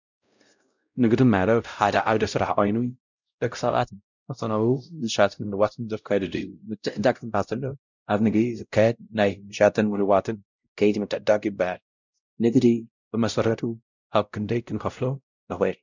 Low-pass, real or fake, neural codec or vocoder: 7.2 kHz; fake; codec, 16 kHz, 0.5 kbps, X-Codec, WavLM features, trained on Multilingual LibriSpeech